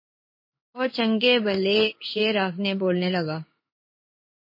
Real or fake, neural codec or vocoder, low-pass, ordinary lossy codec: fake; codec, 16 kHz in and 24 kHz out, 1 kbps, XY-Tokenizer; 5.4 kHz; MP3, 24 kbps